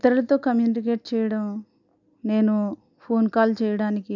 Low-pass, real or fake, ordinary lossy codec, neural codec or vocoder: 7.2 kHz; real; none; none